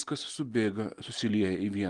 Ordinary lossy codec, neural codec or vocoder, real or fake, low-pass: Opus, 24 kbps; none; real; 10.8 kHz